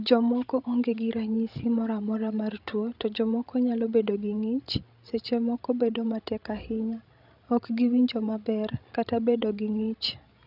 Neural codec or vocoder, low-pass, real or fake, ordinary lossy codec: codec, 16 kHz, 16 kbps, FreqCodec, larger model; 5.4 kHz; fake; none